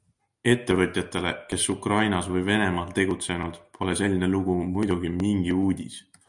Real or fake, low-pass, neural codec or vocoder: real; 10.8 kHz; none